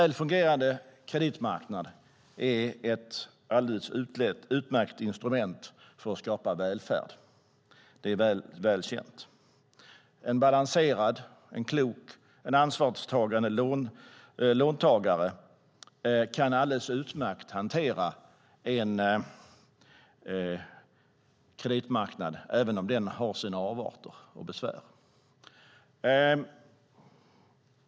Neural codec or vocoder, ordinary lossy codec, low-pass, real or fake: none; none; none; real